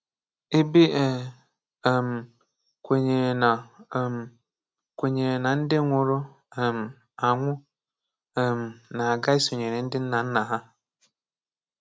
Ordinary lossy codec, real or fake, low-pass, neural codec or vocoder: none; real; none; none